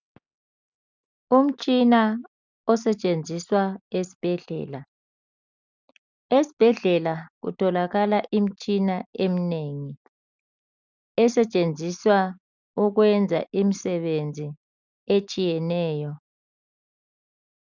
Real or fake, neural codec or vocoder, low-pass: real; none; 7.2 kHz